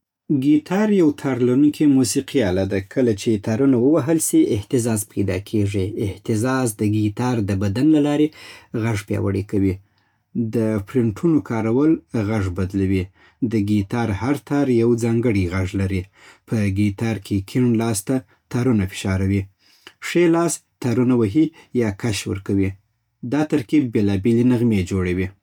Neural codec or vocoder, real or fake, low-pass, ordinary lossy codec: none; real; 19.8 kHz; none